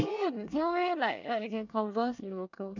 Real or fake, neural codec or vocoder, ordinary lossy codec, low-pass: fake; codec, 24 kHz, 1 kbps, SNAC; none; 7.2 kHz